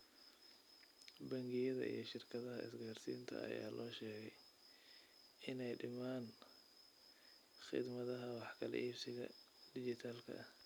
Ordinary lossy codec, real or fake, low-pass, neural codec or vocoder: none; real; none; none